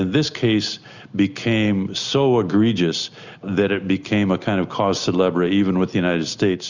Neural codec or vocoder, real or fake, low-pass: none; real; 7.2 kHz